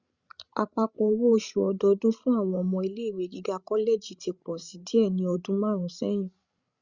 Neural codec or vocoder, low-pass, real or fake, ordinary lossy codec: codec, 16 kHz, 16 kbps, FreqCodec, larger model; 7.2 kHz; fake; Opus, 64 kbps